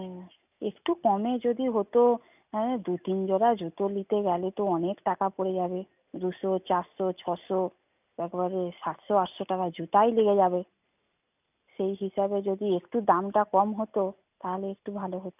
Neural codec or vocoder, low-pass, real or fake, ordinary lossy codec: none; 3.6 kHz; real; none